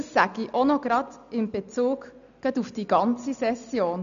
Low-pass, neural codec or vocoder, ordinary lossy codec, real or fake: 7.2 kHz; none; none; real